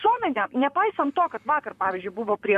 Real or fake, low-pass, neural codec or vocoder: fake; 14.4 kHz; vocoder, 44.1 kHz, 128 mel bands, Pupu-Vocoder